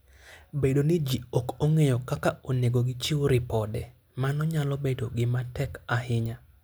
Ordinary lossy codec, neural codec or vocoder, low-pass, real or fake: none; none; none; real